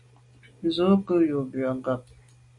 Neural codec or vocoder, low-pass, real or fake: none; 10.8 kHz; real